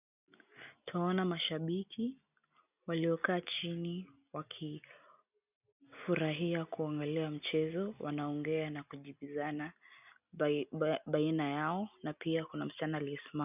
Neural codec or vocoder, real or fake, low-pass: none; real; 3.6 kHz